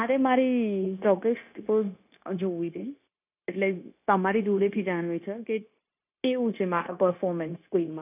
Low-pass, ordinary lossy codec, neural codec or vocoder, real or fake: 3.6 kHz; none; codec, 16 kHz, 0.9 kbps, LongCat-Audio-Codec; fake